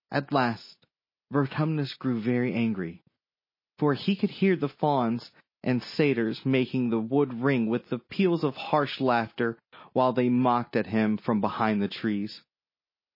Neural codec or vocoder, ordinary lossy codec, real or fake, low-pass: none; MP3, 24 kbps; real; 5.4 kHz